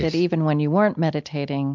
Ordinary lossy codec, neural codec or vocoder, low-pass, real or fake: MP3, 64 kbps; none; 7.2 kHz; real